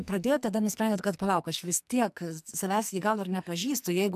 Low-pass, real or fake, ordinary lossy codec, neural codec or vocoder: 14.4 kHz; fake; MP3, 96 kbps; codec, 44.1 kHz, 2.6 kbps, SNAC